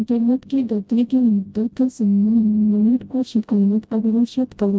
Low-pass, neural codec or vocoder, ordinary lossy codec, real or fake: none; codec, 16 kHz, 0.5 kbps, FreqCodec, smaller model; none; fake